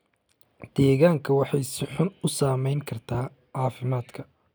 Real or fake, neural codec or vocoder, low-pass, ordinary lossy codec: real; none; none; none